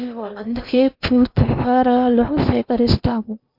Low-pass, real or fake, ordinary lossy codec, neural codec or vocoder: 5.4 kHz; fake; Opus, 64 kbps; codec, 16 kHz in and 24 kHz out, 0.8 kbps, FocalCodec, streaming, 65536 codes